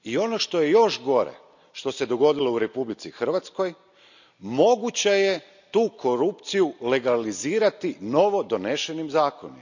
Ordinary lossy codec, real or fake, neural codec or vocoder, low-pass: none; real; none; 7.2 kHz